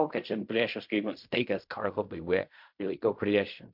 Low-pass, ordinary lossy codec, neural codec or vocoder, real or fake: 5.4 kHz; MP3, 48 kbps; codec, 16 kHz in and 24 kHz out, 0.4 kbps, LongCat-Audio-Codec, fine tuned four codebook decoder; fake